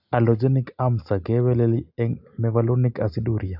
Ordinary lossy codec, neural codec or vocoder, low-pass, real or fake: AAC, 48 kbps; none; 5.4 kHz; real